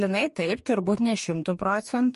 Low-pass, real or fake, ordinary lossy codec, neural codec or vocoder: 14.4 kHz; fake; MP3, 48 kbps; codec, 44.1 kHz, 2.6 kbps, DAC